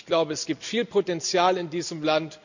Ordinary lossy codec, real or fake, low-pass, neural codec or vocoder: none; real; 7.2 kHz; none